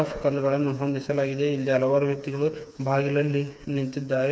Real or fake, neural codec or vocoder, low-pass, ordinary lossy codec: fake; codec, 16 kHz, 4 kbps, FreqCodec, smaller model; none; none